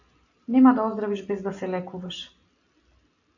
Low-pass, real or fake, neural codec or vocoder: 7.2 kHz; real; none